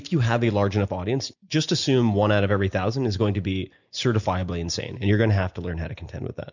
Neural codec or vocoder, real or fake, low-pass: none; real; 7.2 kHz